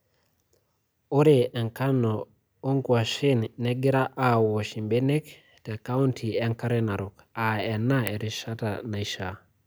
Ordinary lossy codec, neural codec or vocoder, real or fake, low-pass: none; none; real; none